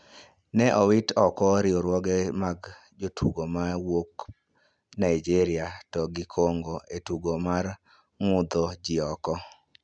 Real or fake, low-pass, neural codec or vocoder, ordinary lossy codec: real; 9.9 kHz; none; none